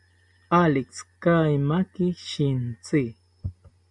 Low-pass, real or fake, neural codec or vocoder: 10.8 kHz; real; none